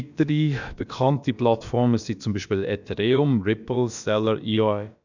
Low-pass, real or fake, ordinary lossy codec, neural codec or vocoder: 7.2 kHz; fake; none; codec, 16 kHz, about 1 kbps, DyCAST, with the encoder's durations